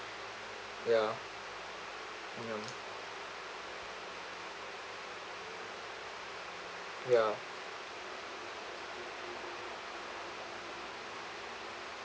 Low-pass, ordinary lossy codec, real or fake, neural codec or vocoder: none; none; real; none